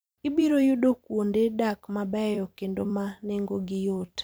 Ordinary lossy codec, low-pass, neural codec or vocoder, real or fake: none; none; vocoder, 44.1 kHz, 128 mel bands every 256 samples, BigVGAN v2; fake